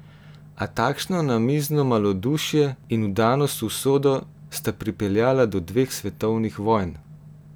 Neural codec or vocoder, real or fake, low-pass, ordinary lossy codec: none; real; none; none